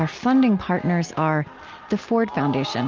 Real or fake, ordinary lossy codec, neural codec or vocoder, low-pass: real; Opus, 32 kbps; none; 7.2 kHz